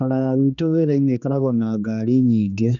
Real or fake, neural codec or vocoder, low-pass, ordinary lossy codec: fake; codec, 16 kHz, 2 kbps, X-Codec, HuBERT features, trained on general audio; 7.2 kHz; none